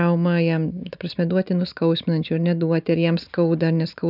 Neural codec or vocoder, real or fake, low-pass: none; real; 5.4 kHz